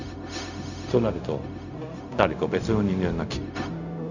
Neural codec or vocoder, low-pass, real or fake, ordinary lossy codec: codec, 16 kHz, 0.4 kbps, LongCat-Audio-Codec; 7.2 kHz; fake; none